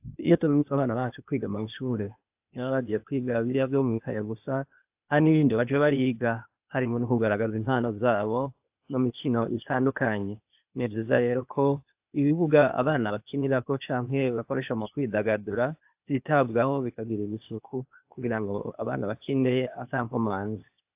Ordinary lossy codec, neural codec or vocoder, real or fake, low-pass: AAC, 32 kbps; codec, 16 kHz, 0.8 kbps, ZipCodec; fake; 3.6 kHz